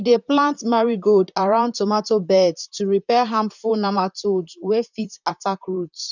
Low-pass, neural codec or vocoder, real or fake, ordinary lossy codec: 7.2 kHz; vocoder, 44.1 kHz, 128 mel bands, Pupu-Vocoder; fake; none